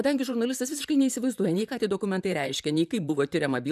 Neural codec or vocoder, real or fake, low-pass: vocoder, 44.1 kHz, 128 mel bands, Pupu-Vocoder; fake; 14.4 kHz